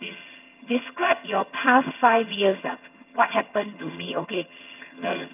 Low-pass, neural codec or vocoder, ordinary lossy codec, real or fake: 3.6 kHz; vocoder, 22.05 kHz, 80 mel bands, HiFi-GAN; none; fake